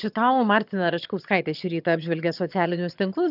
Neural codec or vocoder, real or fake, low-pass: vocoder, 22.05 kHz, 80 mel bands, HiFi-GAN; fake; 5.4 kHz